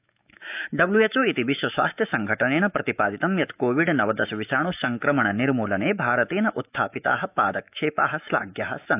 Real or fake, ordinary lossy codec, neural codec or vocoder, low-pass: fake; AAC, 32 kbps; autoencoder, 48 kHz, 128 numbers a frame, DAC-VAE, trained on Japanese speech; 3.6 kHz